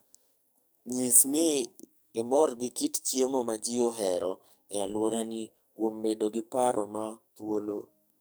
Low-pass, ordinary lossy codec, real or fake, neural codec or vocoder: none; none; fake; codec, 44.1 kHz, 2.6 kbps, SNAC